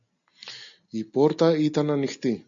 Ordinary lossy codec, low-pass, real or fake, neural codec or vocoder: MP3, 64 kbps; 7.2 kHz; real; none